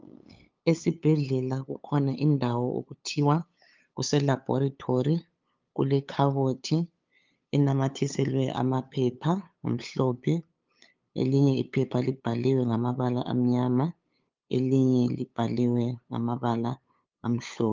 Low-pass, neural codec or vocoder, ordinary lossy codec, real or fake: 7.2 kHz; codec, 16 kHz, 8 kbps, FunCodec, trained on LibriTTS, 25 frames a second; Opus, 24 kbps; fake